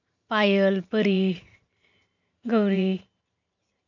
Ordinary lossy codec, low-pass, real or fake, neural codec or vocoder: none; 7.2 kHz; fake; vocoder, 44.1 kHz, 128 mel bands every 512 samples, BigVGAN v2